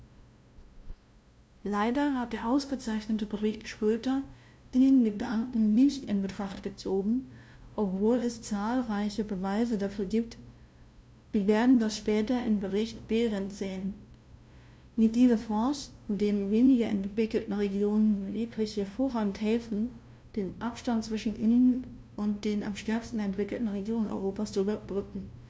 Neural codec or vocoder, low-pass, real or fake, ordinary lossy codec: codec, 16 kHz, 0.5 kbps, FunCodec, trained on LibriTTS, 25 frames a second; none; fake; none